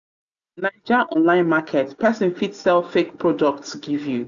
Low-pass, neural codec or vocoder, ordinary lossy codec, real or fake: 7.2 kHz; none; none; real